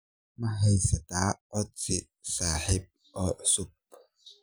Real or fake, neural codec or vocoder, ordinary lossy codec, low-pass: real; none; none; none